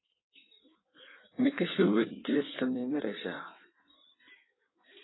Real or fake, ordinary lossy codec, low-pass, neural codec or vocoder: fake; AAC, 16 kbps; 7.2 kHz; codec, 16 kHz in and 24 kHz out, 1.1 kbps, FireRedTTS-2 codec